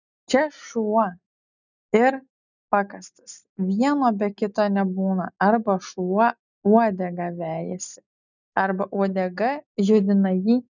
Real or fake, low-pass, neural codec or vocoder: real; 7.2 kHz; none